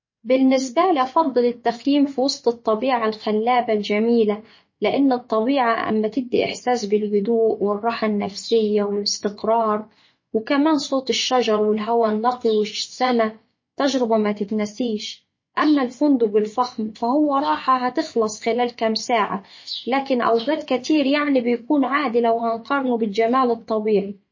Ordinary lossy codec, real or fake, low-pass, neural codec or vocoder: MP3, 32 kbps; fake; 7.2 kHz; vocoder, 24 kHz, 100 mel bands, Vocos